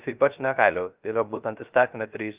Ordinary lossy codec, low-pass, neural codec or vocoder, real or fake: Opus, 24 kbps; 3.6 kHz; codec, 16 kHz, 0.3 kbps, FocalCodec; fake